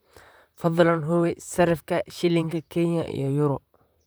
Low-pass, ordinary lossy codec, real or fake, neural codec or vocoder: none; none; fake; vocoder, 44.1 kHz, 128 mel bands, Pupu-Vocoder